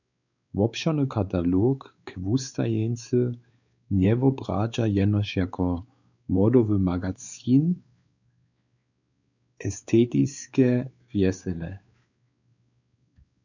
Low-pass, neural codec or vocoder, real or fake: 7.2 kHz; codec, 16 kHz, 4 kbps, X-Codec, WavLM features, trained on Multilingual LibriSpeech; fake